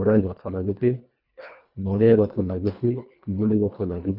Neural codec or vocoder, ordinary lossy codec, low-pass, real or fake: codec, 24 kHz, 1.5 kbps, HILCodec; none; 5.4 kHz; fake